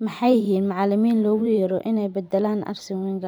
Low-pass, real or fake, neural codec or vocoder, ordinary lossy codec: none; fake; vocoder, 44.1 kHz, 128 mel bands every 512 samples, BigVGAN v2; none